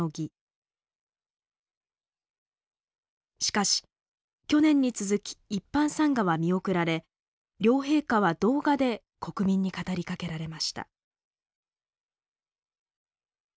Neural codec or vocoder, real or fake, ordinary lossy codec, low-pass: none; real; none; none